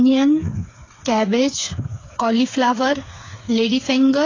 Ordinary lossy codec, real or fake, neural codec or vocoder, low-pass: AAC, 32 kbps; fake; codec, 16 kHz, 4 kbps, FreqCodec, smaller model; 7.2 kHz